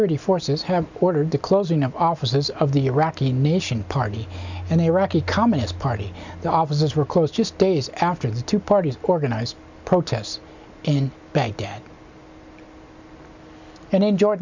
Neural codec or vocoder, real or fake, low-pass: none; real; 7.2 kHz